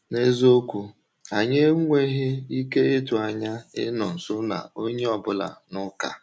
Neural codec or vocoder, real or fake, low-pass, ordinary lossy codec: none; real; none; none